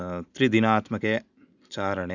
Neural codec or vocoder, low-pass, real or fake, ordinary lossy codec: none; 7.2 kHz; real; none